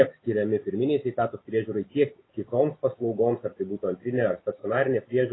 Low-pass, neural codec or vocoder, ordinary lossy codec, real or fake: 7.2 kHz; none; AAC, 16 kbps; real